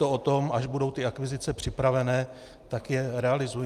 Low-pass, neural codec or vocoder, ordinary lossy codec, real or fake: 14.4 kHz; none; Opus, 32 kbps; real